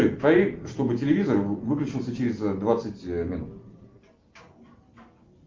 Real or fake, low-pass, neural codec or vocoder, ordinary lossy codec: real; 7.2 kHz; none; Opus, 32 kbps